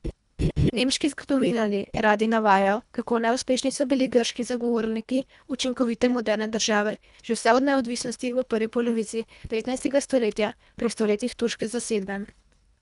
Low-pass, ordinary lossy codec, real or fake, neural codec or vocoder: 10.8 kHz; none; fake; codec, 24 kHz, 1.5 kbps, HILCodec